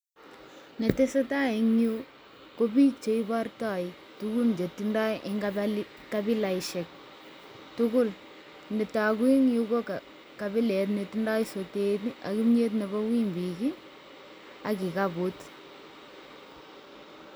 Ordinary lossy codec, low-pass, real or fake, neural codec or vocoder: none; none; real; none